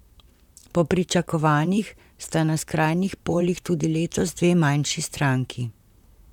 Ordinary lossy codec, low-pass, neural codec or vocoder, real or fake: none; 19.8 kHz; vocoder, 44.1 kHz, 128 mel bands, Pupu-Vocoder; fake